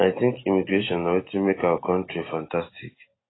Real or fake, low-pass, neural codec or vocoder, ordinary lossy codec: real; 7.2 kHz; none; AAC, 16 kbps